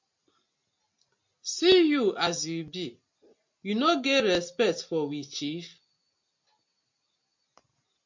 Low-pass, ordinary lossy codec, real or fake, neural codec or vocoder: 7.2 kHz; MP3, 48 kbps; real; none